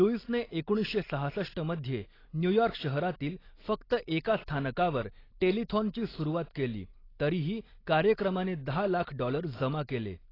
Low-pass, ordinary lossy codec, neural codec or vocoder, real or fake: 5.4 kHz; AAC, 24 kbps; none; real